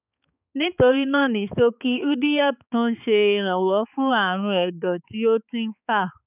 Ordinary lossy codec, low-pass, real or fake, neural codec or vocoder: none; 3.6 kHz; fake; codec, 16 kHz, 4 kbps, X-Codec, HuBERT features, trained on balanced general audio